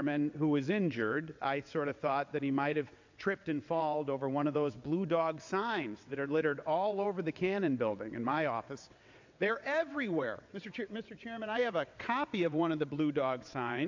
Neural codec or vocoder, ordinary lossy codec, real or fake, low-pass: vocoder, 22.05 kHz, 80 mel bands, WaveNeXt; AAC, 48 kbps; fake; 7.2 kHz